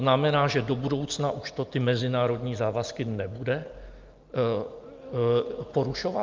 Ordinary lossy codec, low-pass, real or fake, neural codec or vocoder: Opus, 24 kbps; 7.2 kHz; real; none